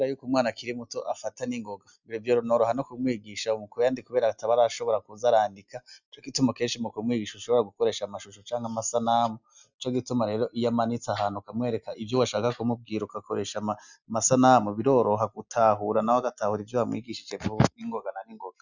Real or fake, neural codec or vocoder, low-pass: real; none; 7.2 kHz